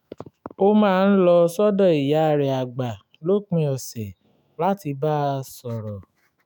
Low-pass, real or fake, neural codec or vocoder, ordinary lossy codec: none; fake; autoencoder, 48 kHz, 128 numbers a frame, DAC-VAE, trained on Japanese speech; none